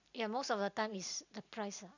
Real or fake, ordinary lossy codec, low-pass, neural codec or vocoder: real; none; 7.2 kHz; none